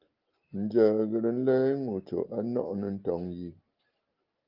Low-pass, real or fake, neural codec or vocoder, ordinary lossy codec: 5.4 kHz; real; none; Opus, 24 kbps